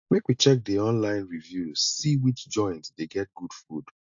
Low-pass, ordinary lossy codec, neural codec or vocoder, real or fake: 7.2 kHz; AAC, 64 kbps; none; real